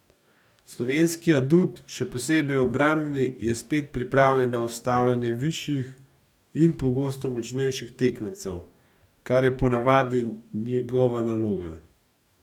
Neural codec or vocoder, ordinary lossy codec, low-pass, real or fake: codec, 44.1 kHz, 2.6 kbps, DAC; none; 19.8 kHz; fake